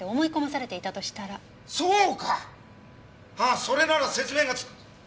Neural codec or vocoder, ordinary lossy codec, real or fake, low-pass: none; none; real; none